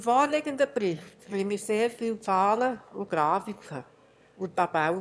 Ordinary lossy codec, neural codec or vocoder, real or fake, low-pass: none; autoencoder, 22.05 kHz, a latent of 192 numbers a frame, VITS, trained on one speaker; fake; none